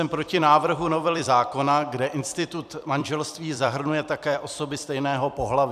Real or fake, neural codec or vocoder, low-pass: fake; vocoder, 44.1 kHz, 128 mel bands every 256 samples, BigVGAN v2; 14.4 kHz